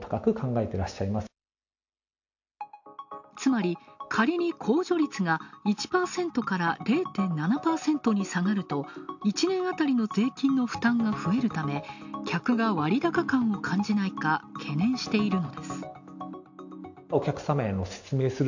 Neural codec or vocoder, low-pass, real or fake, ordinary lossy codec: none; 7.2 kHz; real; none